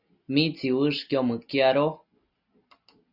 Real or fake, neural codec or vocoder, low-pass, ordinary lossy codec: real; none; 5.4 kHz; Opus, 64 kbps